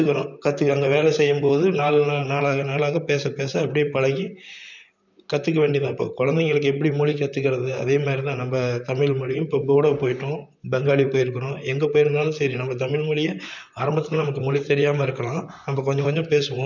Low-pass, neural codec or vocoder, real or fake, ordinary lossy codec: 7.2 kHz; vocoder, 44.1 kHz, 128 mel bands, Pupu-Vocoder; fake; none